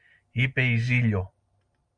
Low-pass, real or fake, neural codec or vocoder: 9.9 kHz; real; none